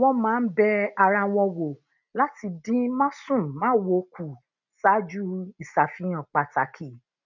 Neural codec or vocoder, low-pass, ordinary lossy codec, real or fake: none; 7.2 kHz; none; real